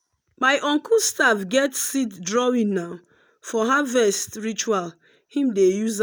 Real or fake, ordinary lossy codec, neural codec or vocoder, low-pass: real; none; none; none